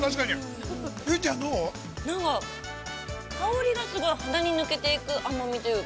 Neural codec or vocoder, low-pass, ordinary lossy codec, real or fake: none; none; none; real